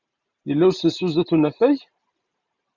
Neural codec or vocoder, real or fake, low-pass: none; real; 7.2 kHz